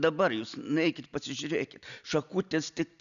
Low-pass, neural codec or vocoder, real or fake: 7.2 kHz; none; real